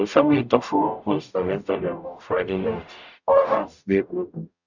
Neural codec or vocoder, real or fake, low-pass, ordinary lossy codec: codec, 44.1 kHz, 0.9 kbps, DAC; fake; 7.2 kHz; none